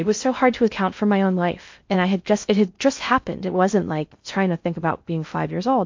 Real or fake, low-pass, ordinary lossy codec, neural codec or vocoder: fake; 7.2 kHz; MP3, 48 kbps; codec, 16 kHz in and 24 kHz out, 0.6 kbps, FocalCodec, streaming, 4096 codes